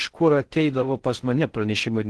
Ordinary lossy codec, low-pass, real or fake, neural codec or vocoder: Opus, 16 kbps; 10.8 kHz; fake; codec, 16 kHz in and 24 kHz out, 0.6 kbps, FocalCodec, streaming, 4096 codes